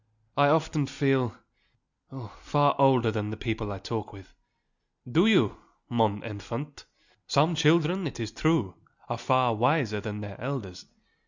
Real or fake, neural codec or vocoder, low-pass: real; none; 7.2 kHz